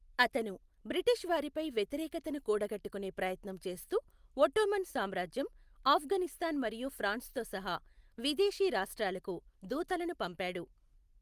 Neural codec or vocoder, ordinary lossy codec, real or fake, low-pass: none; Opus, 32 kbps; real; 14.4 kHz